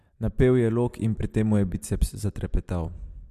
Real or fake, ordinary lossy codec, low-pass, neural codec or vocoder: real; MP3, 64 kbps; 14.4 kHz; none